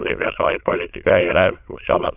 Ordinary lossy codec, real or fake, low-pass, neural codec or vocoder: AAC, 32 kbps; fake; 3.6 kHz; autoencoder, 22.05 kHz, a latent of 192 numbers a frame, VITS, trained on many speakers